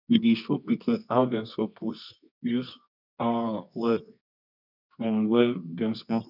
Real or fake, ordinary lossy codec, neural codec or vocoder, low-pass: fake; none; codec, 24 kHz, 0.9 kbps, WavTokenizer, medium music audio release; 5.4 kHz